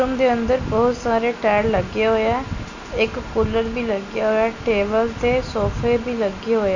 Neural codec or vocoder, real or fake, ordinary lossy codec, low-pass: none; real; none; 7.2 kHz